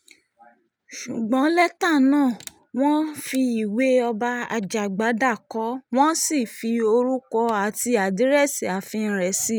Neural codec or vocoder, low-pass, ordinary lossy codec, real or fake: none; none; none; real